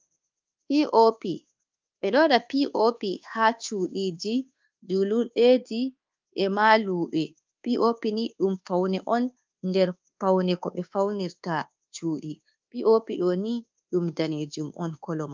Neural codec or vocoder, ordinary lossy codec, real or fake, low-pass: codec, 24 kHz, 1.2 kbps, DualCodec; Opus, 32 kbps; fake; 7.2 kHz